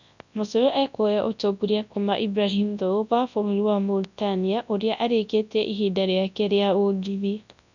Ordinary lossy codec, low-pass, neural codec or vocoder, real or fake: none; 7.2 kHz; codec, 24 kHz, 0.9 kbps, WavTokenizer, large speech release; fake